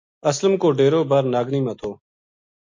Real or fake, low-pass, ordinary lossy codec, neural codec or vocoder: real; 7.2 kHz; MP3, 48 kbps; none